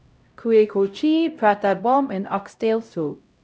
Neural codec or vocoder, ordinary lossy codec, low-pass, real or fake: codec, 16 kHz, 0.5 kbps, X-Codec, HuBERT features, trained on LibriSpeech; none; none; fake